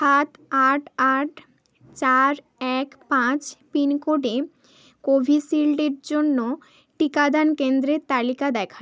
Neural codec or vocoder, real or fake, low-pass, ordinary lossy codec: none; real; none; none